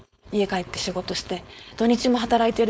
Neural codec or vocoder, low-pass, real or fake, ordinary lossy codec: codec, 16 kHz, 4.8 kbps, FACodec; none; fake; none